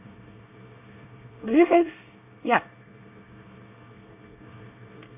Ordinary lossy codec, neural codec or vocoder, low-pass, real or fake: none; codec, 24 kHz, 1 kbps, SNAC; 3.6 kHz; fake